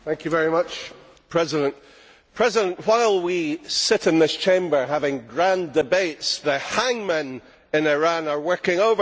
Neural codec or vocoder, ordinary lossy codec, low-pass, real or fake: none; none; none; real